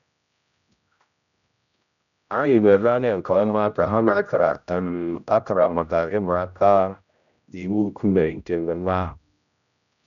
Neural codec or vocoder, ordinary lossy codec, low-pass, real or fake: codec, 16 kHz, 0.5 kbps, X-Codec, HuBERT features, trained on general audio; none; 7.2 kHz; fake